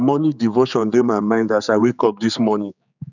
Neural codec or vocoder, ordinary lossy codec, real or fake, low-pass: codec, 16 kHz, 4 kbps, X-Codec, HuBERT features, trained on general audio; none; fake; 7.2 kHz